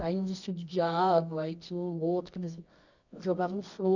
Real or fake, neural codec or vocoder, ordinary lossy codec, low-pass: fake; codec, 24 kHz, 0.9 kbps, WavTokenizer, medium music audio release; none; 7.2 kHz